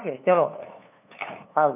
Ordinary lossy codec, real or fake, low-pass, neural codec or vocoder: none; fake; 3.6 kHz; codec, 16 kHz, 2 kbps, FunCodec, trained on LibriTTS, 25 frames a second